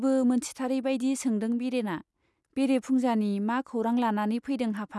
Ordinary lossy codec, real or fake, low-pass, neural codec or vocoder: none; real; none; none